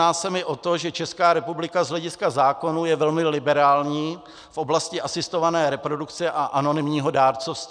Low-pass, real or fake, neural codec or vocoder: 9.9 kHz; real; none